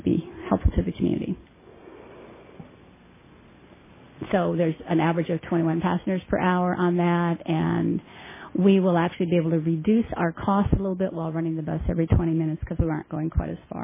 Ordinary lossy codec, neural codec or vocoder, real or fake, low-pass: MP3, 16 kbps; none; real; 3.6 kHz